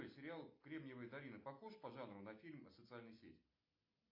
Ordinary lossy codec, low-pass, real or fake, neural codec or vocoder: MP3, 32 kbps; 5.4 kHz; real; none